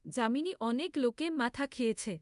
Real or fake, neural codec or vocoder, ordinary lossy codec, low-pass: fake; codec, 24 kHz, 0.5 kbps, DualCodec; none; 10.8 kHz